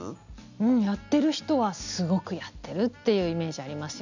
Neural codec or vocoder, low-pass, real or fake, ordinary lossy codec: none; 7.2 kHz; real; none